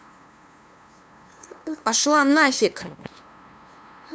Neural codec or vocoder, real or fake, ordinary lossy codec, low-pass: codec, 16 kHz, 2 kbps, FunCodec, trained on LibriTTS, 25 frames a second; fake; none; none